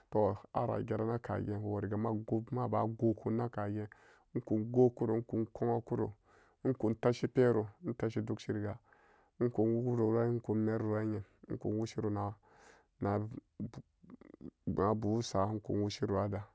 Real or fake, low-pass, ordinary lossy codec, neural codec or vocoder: real; none; none; none